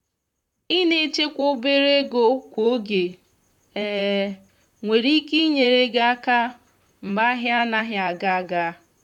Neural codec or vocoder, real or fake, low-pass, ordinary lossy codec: vocoder, 44.1 kHz, 128 mel bands, Pupu-Vocoder; fake; 19.8 kHz; none